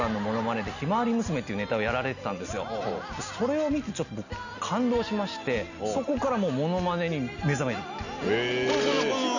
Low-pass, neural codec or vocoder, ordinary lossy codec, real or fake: 7.2 kHz; none; none; real